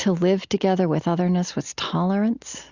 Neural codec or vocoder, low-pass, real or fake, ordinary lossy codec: none; 7.2 kHz; real; Opus, 64 kbps